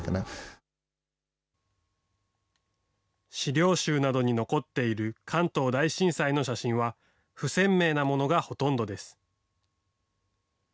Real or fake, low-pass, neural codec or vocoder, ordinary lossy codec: real; none; none; none